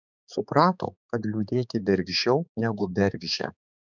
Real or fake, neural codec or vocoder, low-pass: fake; codec, 16 kHz, 4 kbps, X-Codec, HuBERT features, trained on balanced general audio; 7.2 kHz